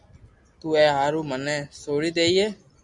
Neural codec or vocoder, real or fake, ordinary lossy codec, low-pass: none; real; Opus, 64 kbps; 10.8 kHz